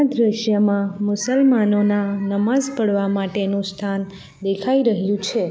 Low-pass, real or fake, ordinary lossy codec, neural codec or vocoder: none; real; none; none